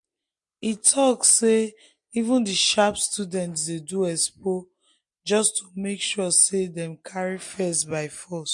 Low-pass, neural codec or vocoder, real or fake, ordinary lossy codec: 10.8 kHz; none; real; MP3, 48 kbps